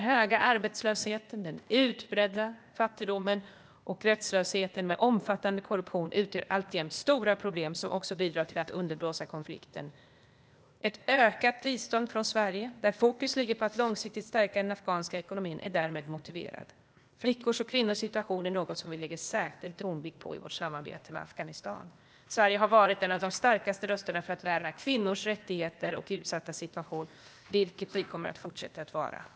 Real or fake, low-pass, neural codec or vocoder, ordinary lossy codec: fake; none; codec, 16 kHz, 0.8 kbps, ZipCodec; none